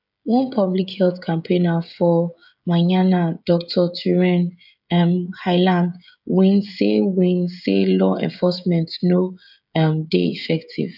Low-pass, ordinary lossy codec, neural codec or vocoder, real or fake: 5.4 kHz; none; codec, 16 kHz, 16 kbps, FreqCodec, smaller model; fake